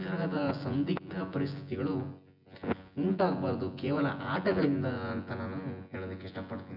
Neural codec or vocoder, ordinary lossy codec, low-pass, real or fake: vocoder, 24 kHz, 100 mel bands, Vocos; none; 5.4 kHz; fake